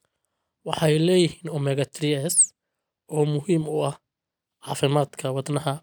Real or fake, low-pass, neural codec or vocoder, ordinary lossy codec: real; none; none; none